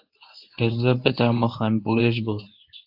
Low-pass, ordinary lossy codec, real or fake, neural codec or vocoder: 5.4 kHz; AAC, 48 kbps; fake; codec, 24 kHz, 0.9 kbps, WavTokenizer, medium speech release version 1